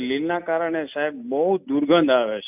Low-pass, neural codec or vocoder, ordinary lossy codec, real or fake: 3.6 kHz; none; none; real